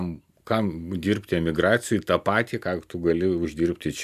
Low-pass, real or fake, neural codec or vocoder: 14.4 kHz; real; none